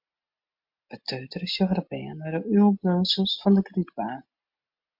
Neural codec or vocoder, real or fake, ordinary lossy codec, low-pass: none; real; MP3, 48 kbps; 5.4 kHz